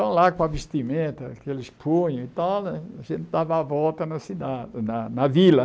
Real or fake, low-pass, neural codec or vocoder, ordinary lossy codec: real; none; none; none